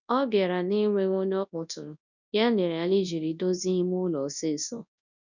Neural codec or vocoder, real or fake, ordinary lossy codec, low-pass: codec, 24 kHz, 0.9 kbps, WavTokenizer, large speech release; fake; none; 7.2 kHz